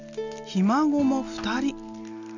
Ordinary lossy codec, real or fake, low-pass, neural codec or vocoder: none; real; 7.2 kHz; none